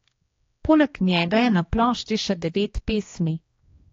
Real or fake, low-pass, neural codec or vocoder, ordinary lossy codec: fake; 7.2 kHz; codec, 16 kHz, 2 kbps, X-Codec, HuBERT features, trained on general audio; AAC, 32 kbps